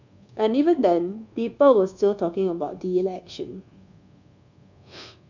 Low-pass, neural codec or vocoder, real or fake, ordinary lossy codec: 7.2 kHz; codec, 24 kHz, 1.2 kbps, DualCodec; fake; none